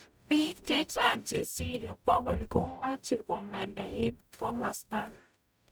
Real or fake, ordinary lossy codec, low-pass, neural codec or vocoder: fake; none; none; codec, 44.1 kHz, 0.9 kbps, DAC